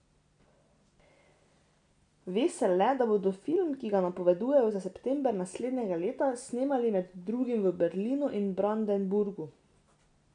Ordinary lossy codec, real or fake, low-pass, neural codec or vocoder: none; real; 9.9 kHz; none